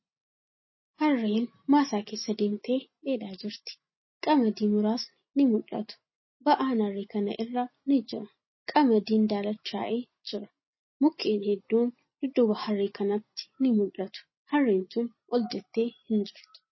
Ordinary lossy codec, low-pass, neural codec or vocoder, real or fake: MP3, 24 kbps; 7.2 kHz; none; real